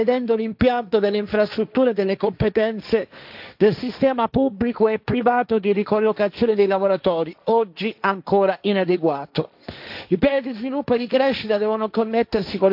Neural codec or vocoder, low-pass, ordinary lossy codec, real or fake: codec, 16 kHz, 1.1 kbps, Voila-Tokenizer; 5.4 kHz; AAC, 48 kbps; fake